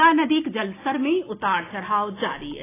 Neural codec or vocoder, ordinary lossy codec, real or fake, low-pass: none; AAC, 16 kbps; real; 3.6 kHz